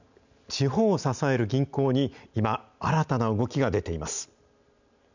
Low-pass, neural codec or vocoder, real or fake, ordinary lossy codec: 7.2 kHz; none; real; none